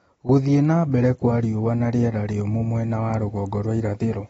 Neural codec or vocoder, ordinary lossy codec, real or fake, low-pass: none; AAC, 24 kbps; real; 10.8 kHz